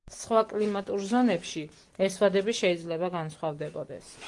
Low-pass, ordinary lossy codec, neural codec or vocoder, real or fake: 10.8 kHz; Opus, 24 kbps; none; real